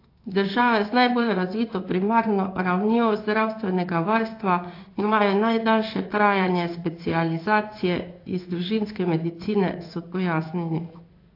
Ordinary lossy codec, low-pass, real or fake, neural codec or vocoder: AAC, 32 kbps; 5.4 kHz; fake; codec, 16 kHz in and 24 kHz out, 1 kbps, XY-Tokenizer